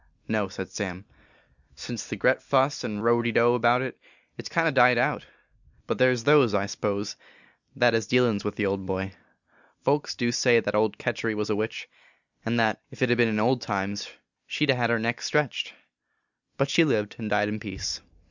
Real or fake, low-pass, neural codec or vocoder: real; 7.2 kHz; none